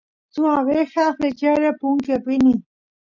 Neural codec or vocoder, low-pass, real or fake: none; 7.2 kHz; real